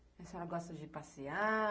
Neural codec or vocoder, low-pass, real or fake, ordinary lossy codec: none; none; real; none